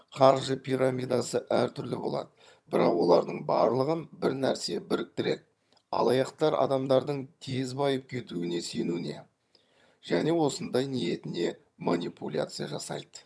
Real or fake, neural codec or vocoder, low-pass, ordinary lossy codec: fake; vocoder, 22.05 kHz, 80 mel bands, HiFi-GAN; none; none